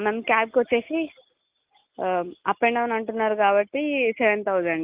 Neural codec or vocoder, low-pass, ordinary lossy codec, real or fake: none; 3.6 kHz; Opus, 24 kbps; real